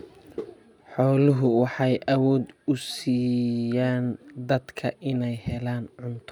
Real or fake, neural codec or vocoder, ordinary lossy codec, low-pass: real; none; none; 19.8 kHz